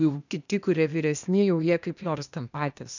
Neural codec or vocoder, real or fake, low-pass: codec, 16 kHz, 0.8 kbps, ZipCodec; fake; 7.2 kHz